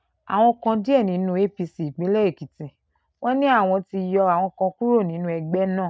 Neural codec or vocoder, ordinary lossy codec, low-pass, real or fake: none; none; none; real